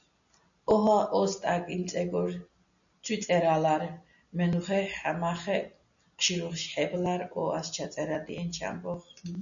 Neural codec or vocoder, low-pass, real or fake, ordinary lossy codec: none; 7.2 kHz; real; MP3, 96 kbps